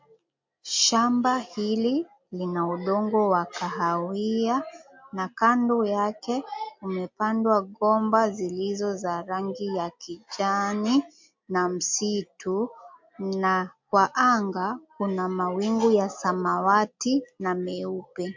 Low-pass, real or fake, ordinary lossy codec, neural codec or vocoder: 7.2 kHz; real; MP3, 48 kbps; none